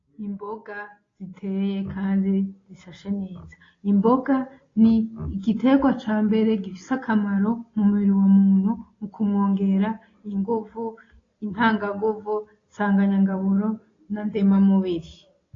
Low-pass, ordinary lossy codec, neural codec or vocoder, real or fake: 7.2 kHz; AAC, 32 kbps; none; real